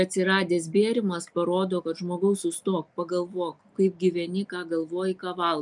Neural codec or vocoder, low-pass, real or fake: none; 10.8 kHz; real